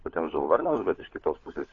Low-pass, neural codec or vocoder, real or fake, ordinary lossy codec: 7.2 kHz; codec, 16 kHz, 4 kbps, FunCodec, trained on LibriTTS, 50 frames a second; fake; AAC, 32 kbps